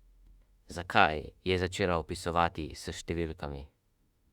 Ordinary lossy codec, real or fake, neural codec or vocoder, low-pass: Opus, 64 kbps; fake; autoencoder, 48 kHz, 32 numbers a frame, DAC-VAE, trained on Japanese speech; 19.8 kHz